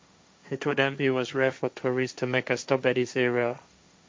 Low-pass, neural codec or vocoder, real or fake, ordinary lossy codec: none; codec, 16 kHz, 1.1 kbps, Voila-Tokenizer; fake; none